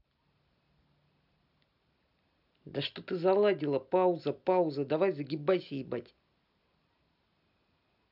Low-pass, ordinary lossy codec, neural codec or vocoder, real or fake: 5.4 kHz; none; none; real